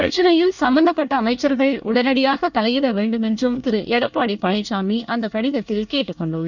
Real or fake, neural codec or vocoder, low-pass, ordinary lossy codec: fake; codec, 24 kHz, 1 kbps, SNAC; 7.2 kHz; none